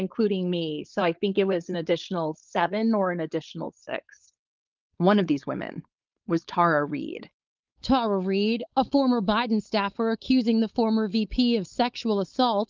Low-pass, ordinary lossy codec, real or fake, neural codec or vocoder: 7.2 kHz; Opus, 24 kbps; fake; codec, 16 kHz, 4.8 kbps, FACodec